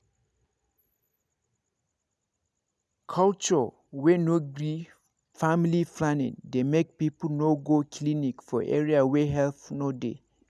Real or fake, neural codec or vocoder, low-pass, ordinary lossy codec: real; none; none; none